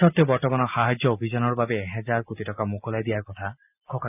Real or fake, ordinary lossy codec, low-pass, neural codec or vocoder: real; none; 3.6 kHz; none